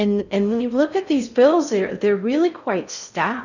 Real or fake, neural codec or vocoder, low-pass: fake; codec, 16 kHz in and 24 kHz out, 0.6 kbps, FocalCodec, streaming, 4096 codes; 7.2 kHz